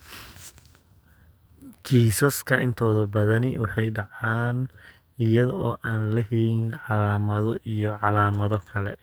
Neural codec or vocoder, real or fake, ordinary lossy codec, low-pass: codec, 44.1 kHz, 2.6 kbps, SNAC; fake; none; none